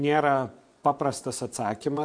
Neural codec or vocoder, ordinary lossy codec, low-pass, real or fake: vocoder, 24 kHz, 100 mel bands, Vocos; MP3, 64 kbps; 9.9 kHz; fake